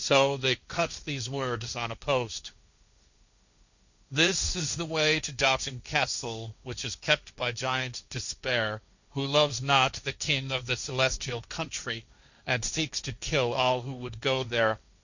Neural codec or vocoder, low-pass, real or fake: codec, 16 kHz, 1.1 kbps, Voila-Tokenizer; 7.2 kHz; fake